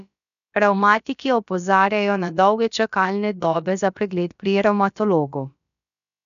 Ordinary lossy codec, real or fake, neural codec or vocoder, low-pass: none; fake; codec, 16 kHz, about 1 kbps, DyCAST, with the encoder's durations; 7.2 kHz